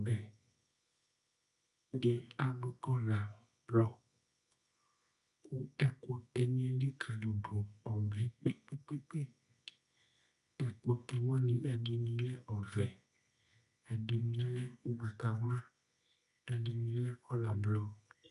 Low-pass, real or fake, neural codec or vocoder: 10.8 kHz; fake; codec, 24 kHz, 0.9 kbps, WavTokenizer, medium music audio release